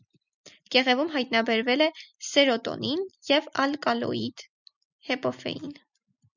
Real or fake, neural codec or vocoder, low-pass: real; none; 7.2 kHz